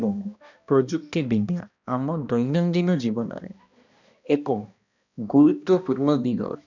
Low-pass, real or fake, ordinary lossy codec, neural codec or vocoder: 7.2 kHz; fake; none; codec, 16 kHz, 1 kbps, X-Codec, HuBERT features, trained on balanced general audio